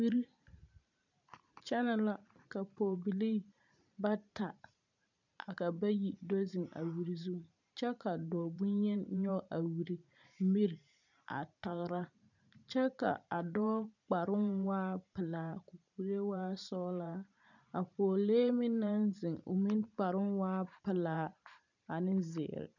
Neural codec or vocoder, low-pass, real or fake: vocoder, 44.1 kHz, 128 mel bands every 512 samples, BigVGAN v2; 7.2 kHz; fake